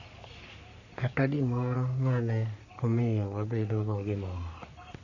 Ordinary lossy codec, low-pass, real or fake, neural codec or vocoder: none; 7.2 kHz; fake; codec, 44.1 kHz, 3.4 kbps, Pupu-Codec